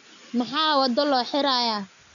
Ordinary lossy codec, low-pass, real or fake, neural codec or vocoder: none; 7.2 kHz; real; none